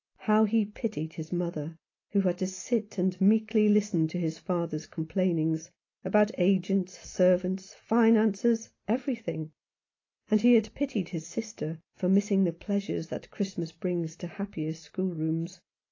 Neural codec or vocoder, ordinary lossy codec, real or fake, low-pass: none; AAC, 32 kbps; real; 7.2 kHz